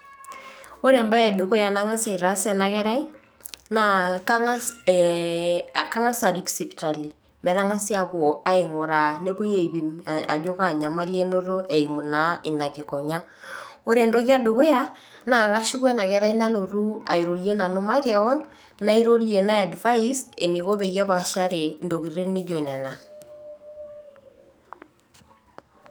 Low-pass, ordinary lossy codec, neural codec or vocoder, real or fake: none; none; codec, 44.1 kHz, 2.6 kbps, SNAC; fake